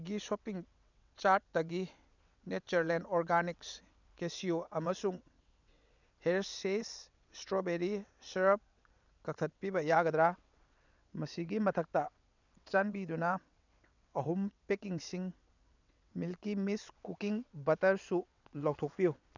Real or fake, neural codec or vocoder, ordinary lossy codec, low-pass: fake; vocoder, 22.05 kHz, 80 mel bands, WaveNeXt; none; 7.2 kHz